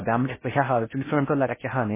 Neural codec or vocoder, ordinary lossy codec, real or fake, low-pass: codec, 16 kHz in and 24 kHz out, 0.6 kbps, FocalCodec, streaming, 4096 codes; MP3, 16 kbps; fake; 3.6 kHz